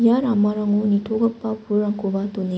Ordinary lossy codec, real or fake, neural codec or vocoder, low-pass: none; real; none; none